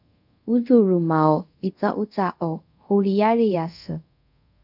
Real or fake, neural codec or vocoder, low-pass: fake; codec, 24 kHz, 0.5 kbps, DualCodec; 5.4 kHz